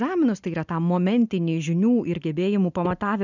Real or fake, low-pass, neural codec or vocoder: real; 7.2 kHz; none